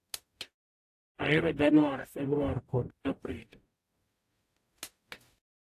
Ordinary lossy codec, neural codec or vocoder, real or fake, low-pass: AAC, 64 kbps; codec, 44.1 kHz, 0.9 kbps, DAC; fake; 14.4 kHz